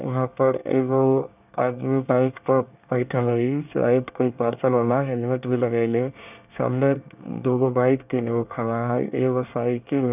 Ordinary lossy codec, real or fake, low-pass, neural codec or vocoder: none; fake; 3.6 kHz; codec, 24 kHz, 1 kbps, SNAC